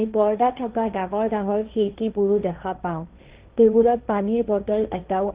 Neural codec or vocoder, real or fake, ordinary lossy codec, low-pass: codec, 16 kHz, 1.1 kbps, Voila-Tokenizer; fake; Opus, 24 kbps; 3.6 kHz